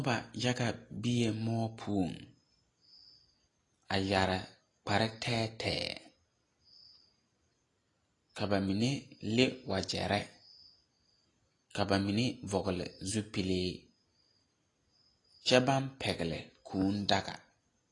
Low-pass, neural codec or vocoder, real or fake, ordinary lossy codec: 10.8 kHz; none; real; AAC, 32 kbps